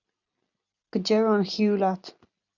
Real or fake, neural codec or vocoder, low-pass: real; none; 7.2 kHz